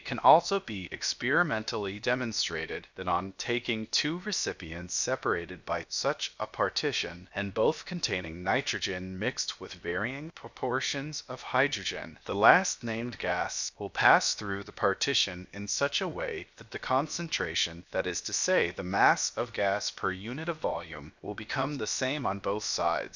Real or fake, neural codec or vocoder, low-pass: fake; codec, 16 kHz, about 1 kbps, DyCAST, with the encoder's durations; 7.2 kHz